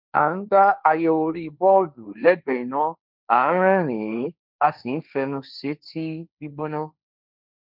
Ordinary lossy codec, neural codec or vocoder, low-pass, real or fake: none; codec, 16 kHz, 1.1 kbps, Voila-Tokenizer; 5.4 kHz; fake